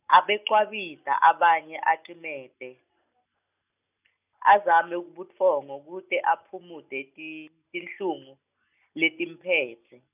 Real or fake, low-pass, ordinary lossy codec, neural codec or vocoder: real; 3.6 kHz; none; none